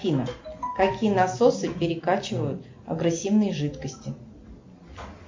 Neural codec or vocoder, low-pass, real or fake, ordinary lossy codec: none; 7.2 kHz; real; MP3, 48 kbps